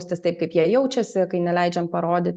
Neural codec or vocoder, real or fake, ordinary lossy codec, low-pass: none; real; Opus, 64 kbps; 9.9 kHz